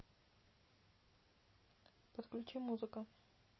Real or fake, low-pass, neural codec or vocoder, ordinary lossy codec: real; 7.2 kHz; none; MP3, 24 kbps